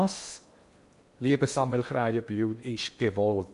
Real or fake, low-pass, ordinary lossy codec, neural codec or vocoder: fake; 10.8 kHz; MP3, 64 kbps; codec, 16 kHz in and 24 kHz out, 0.8 kbps, FocalCodec, streaming, 65536 codes